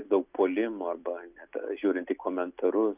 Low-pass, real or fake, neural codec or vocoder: 3.6 kHz; real; none